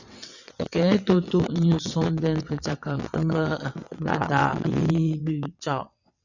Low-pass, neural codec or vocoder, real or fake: 7.2 kHz; vocoder, 22.05 kHz, 80 mel bands, WaveNeXt; fake